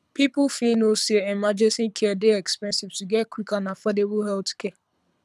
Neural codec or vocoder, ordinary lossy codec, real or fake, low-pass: codec, 24 kHz, 6 kbps, HILCodec; none; fake; none